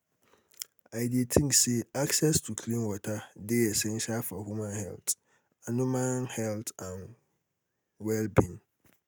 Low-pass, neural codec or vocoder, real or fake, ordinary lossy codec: none; none; real; none